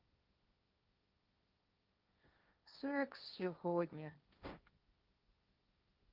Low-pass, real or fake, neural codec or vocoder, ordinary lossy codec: 5.4 kHz; fake; codec, 16 kHz, 1.1 kbps, Voila-Tokenizer; Opus, 24 kbps